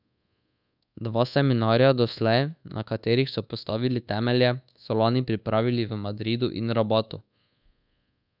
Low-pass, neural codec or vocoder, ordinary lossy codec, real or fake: 5.4 kHz; codec, 24 kHz, 1.2 kbps, DualCodec; none; fake